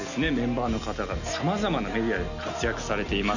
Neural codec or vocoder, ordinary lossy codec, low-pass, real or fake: none; none; 7.2 kHz; real